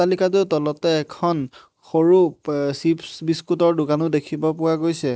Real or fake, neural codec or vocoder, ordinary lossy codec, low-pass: real; none; none; none